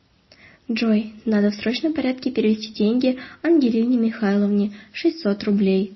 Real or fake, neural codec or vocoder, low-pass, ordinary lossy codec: real; none; 7.2 kHz; MP3, 24 kbps